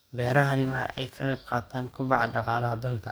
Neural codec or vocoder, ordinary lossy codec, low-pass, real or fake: codec, 44.1 kHz, 2.6 kbps, DAC; none; none; fake